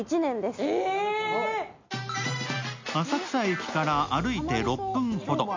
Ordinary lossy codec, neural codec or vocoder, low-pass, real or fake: MP3, 64 kbps; none; 7.2 kHz; real